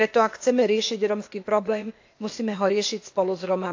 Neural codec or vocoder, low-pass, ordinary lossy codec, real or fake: codec, 16 kHz, 0.8 kbps, ZipCodec; 7.2 kHz; AAC, 48 kbps; fake